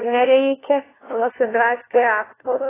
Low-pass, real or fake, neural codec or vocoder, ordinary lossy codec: 3.6 kHz; fake; codec, 16 kHz, 1 kbps, FunCodec, trained on LibriTTS, 50 frames a second; AAC, 16 kbps